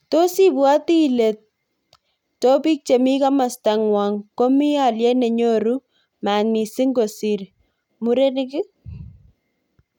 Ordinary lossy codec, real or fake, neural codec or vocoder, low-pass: none; real; none; 19.8 kHz